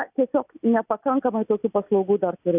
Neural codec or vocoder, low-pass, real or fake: none; 3.6 kHz; real